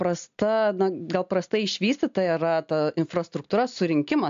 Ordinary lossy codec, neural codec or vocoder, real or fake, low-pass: AAC, 64 kbps; none; real; 7.2 kHz